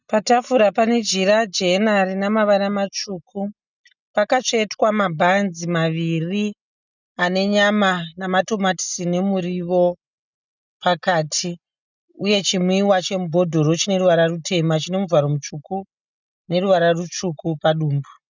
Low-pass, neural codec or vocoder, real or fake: 7.2 kHz; none; real